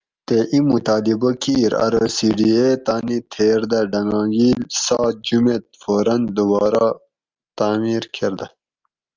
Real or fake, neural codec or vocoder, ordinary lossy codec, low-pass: real; none; Opus, 32 kbps; 7.2 kHz